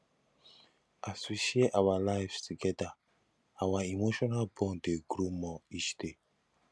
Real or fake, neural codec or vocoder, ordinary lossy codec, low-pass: real; none; none; none